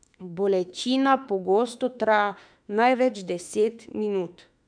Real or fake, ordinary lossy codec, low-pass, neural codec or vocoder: fake; none; 9.9 kHz; autoencoder, 48 kHz, 32 numbers a frame, DAC-VAE, trained on Japanese speech